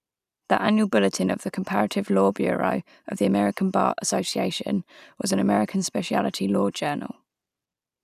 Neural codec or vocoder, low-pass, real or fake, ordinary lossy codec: none; 14.4 kHz; real; none